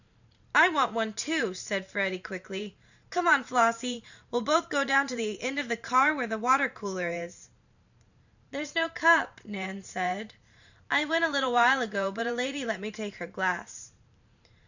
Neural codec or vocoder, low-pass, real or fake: vocoder, 44.1 kHz, 128 mel bands every 512 samples, BigVGAN v2; 7.2 kHz; fake